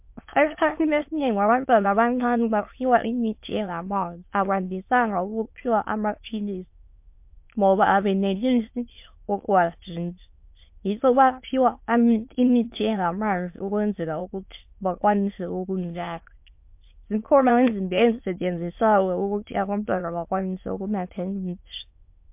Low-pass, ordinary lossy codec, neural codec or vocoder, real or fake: 3.6 kHz; MP3, 32 kbps; autoencoder, 22.05 kHz, a latent of 192 numbers a frame, VITS, trained on many speakers; fake